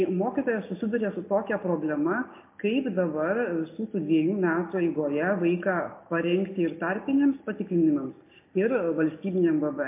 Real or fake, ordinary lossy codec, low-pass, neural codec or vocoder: real; MP3, 24 kbps; 3.6 kHz; none